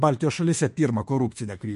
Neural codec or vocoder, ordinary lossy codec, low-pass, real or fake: autoencoder, 48 kHz, 32 numbers a frame, DAC-VAE, trained on Japanese speech; MP3, 48 kbps; 14.4 kHz; fake